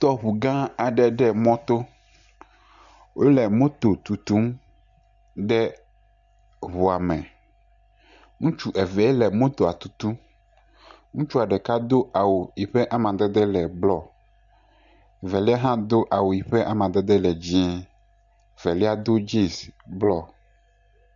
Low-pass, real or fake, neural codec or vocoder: 7.2 kHz; real; none